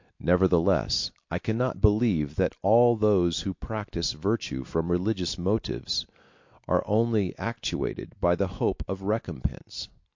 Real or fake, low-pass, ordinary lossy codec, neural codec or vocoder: real; 7.2 kHz; MP3, 48 kbps; none